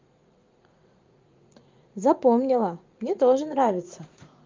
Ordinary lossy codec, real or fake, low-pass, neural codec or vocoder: Opus, 24 kbps; fake; 7.2 kHz; vocoder, 44.1 kHz, 80 mel bands, Vocos